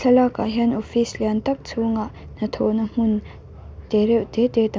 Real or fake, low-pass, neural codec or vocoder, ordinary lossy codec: real; none; none; none